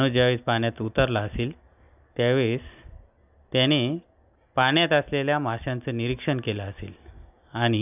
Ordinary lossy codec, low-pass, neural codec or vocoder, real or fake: none; 3.6 kHz; none; real